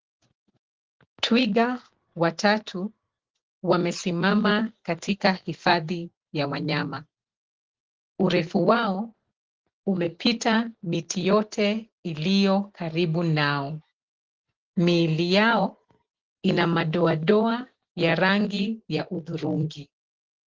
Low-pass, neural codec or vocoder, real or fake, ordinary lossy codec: 7.2 kHz; none; real; Opus, 16 kbps